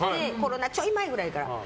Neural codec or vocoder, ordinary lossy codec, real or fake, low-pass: none; none; real; none